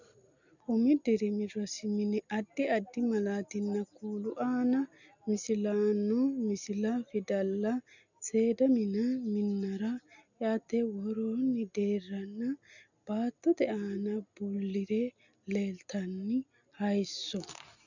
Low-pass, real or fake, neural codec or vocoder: 7.2 kHz; real; none